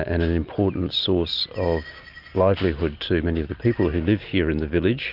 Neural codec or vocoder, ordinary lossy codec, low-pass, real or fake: none; Opus, 32 kbps; 5.4 kHz; real